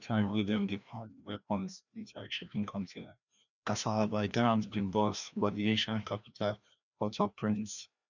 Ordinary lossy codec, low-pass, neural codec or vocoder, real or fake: none; 7.2 kHz; codec, 16 kHz, 1 kbps, FreqCodec, larger model; fake